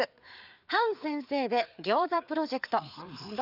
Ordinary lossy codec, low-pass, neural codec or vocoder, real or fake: none; 5.4 kHz; codec, 16 kHz, 4 kbps, FreqCodec, larger model; fake